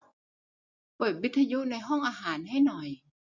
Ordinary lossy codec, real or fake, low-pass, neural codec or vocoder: none; real; 7.2 kHz; none